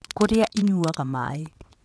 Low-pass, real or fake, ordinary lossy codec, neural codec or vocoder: none; fake; none; vocoder, 22.05 kHz, 80 mel bands, WaveNeXt